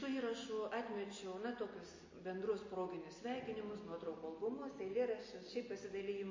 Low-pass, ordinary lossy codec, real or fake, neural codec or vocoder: 7.2 kHz; MP3, 32 kbps; real; none